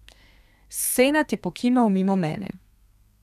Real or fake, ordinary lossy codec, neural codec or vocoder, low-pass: fake; none; codec, 32 kHz, 1.9 kbps, SNAC; 14.4 kHz